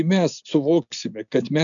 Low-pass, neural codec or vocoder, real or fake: 7.2 kHz; none; real